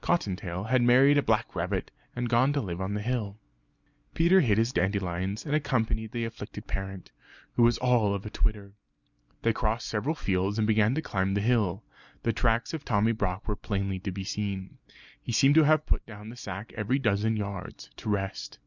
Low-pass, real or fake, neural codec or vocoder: 7.2 kHz; real; none